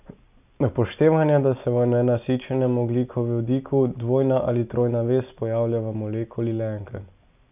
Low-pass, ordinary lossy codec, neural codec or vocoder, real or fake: 3.6 kHz; none; none; real